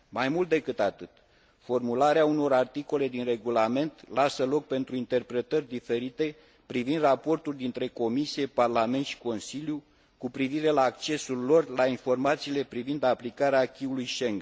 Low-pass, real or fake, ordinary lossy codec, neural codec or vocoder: none; real; none; none